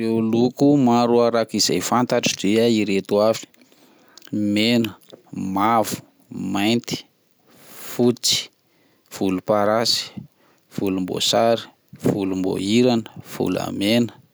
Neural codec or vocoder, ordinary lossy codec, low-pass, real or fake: none; none; none; real